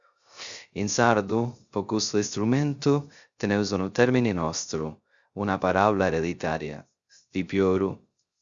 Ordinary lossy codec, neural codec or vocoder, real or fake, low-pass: Opus, 64 kbps; codec, 16 kHz, 0.3 kbps, FocalCodec; fake; 7.2 kHz